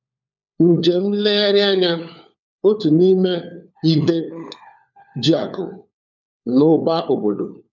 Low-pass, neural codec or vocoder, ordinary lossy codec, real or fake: 7.2 kHz; codec, 16 kHz, 4 kbps, FunCodec, trained on LibriTTS, 50 frames a second; none; fake